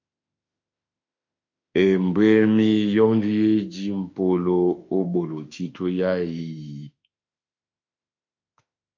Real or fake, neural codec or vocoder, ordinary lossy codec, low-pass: fake; autoencoder, 48 kHz, 32 numbers a frame, DAC-VAE, trained on Japanese speech; MP3, 48 kbps; 7.2 kHz